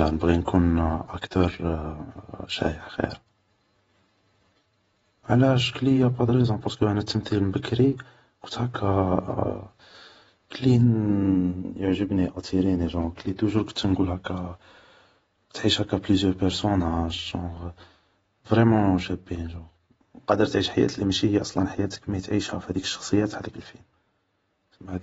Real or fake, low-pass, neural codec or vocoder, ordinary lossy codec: fake; 19.8 kHz; vocoder, 48 kHz, 128 mel bands, Vocos; AAC, 24 kbps